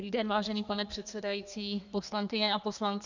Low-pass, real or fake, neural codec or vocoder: 7.2 kHz; fake; codec, 32 kHz, 1.9 kbps, SNAC